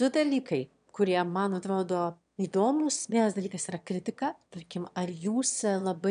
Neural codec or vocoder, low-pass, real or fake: autoencoder, 22.05 kHz, a latent of 192 numbers a frame, VITS, trained on one speaker; 9.9 kHz; fake